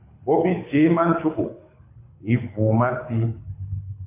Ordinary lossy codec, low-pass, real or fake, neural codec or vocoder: MP3, 24 kbps; 3.6 kHz; fake; codec, 24 kHz, 6 kbps, HILCodec